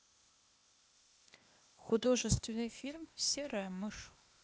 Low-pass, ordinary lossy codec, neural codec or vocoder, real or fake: none; none; codec, 16 kHz, 0.8 kbps, ZipCodec; fake